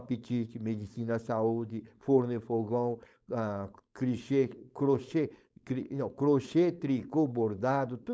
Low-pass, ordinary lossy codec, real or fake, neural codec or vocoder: none; none; fake; codec, 16 kHz, 4.8 kbps, FACodec